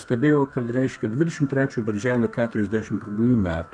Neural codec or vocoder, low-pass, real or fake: codec, 32 kHz, 1.9 kbps, SNAC; 9.9 kHz; fake